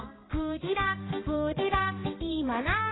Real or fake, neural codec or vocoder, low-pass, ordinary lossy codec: fake; codec, 16 kHz, 2 kbps, X-Codec, HuBERT features, trained on balanced general audio; 7.2 kHz; AAC, 16 kbps